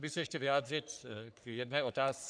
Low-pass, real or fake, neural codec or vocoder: 9.9 kHz; fake; codec, 44.1 kHz, 3.4 kbps, Pupu-Codec